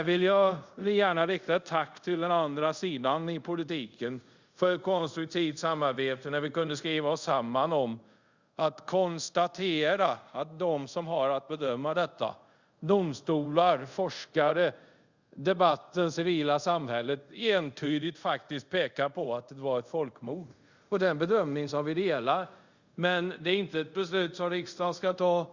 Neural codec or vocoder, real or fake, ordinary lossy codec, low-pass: codec, 24 kHz, 0.5 kbps, DualCodec; fake; Opus, 64 kbps; 7.2 kHz